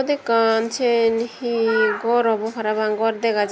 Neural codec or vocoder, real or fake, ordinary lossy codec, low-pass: none; real; none; none